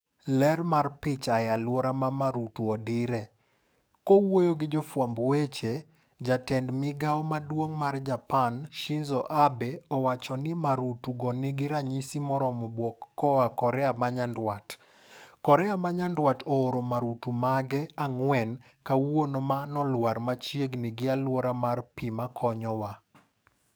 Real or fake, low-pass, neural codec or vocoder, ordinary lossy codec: fake; none; codec, 44.1 kHz, 7.8 kbps, DAC; none